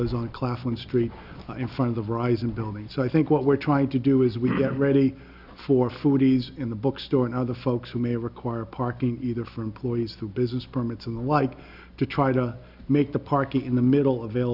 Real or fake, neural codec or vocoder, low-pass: real; none; 5.4 kHz